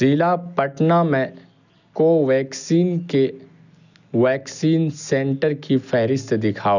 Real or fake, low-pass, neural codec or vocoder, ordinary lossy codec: real; 7.2 kHz; none; none